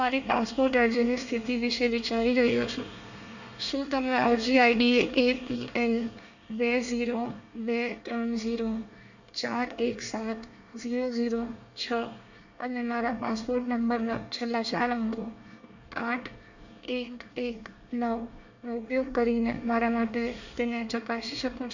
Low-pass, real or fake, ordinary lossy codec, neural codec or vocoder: 7.2 kHz; fake; none; codec, 24 kHz, 1 kbps, SNAC